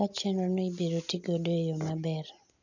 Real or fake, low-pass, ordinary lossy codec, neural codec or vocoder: real; 7.2 kHz; none; none